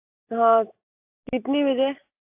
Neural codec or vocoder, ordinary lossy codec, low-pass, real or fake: none; AAC, 24 kbps; 3.6 kHz; real